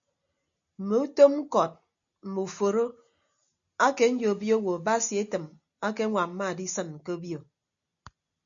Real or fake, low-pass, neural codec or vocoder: real; 7.2 kHz; none